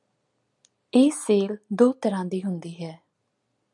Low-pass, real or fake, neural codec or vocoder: 10.8 kHz; real; none